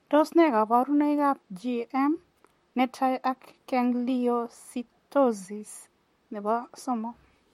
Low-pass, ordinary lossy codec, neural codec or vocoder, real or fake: 19.8 kHz; MP3, 64 kbps; vocoder, 44.1 kHz, 128 mel bands, Pupu-Vocoder; fake